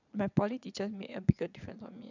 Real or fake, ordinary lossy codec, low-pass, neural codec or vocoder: fake; none; 7.2 kHz; vocoder, 22.05 kHz, 80 mel bands, Vocos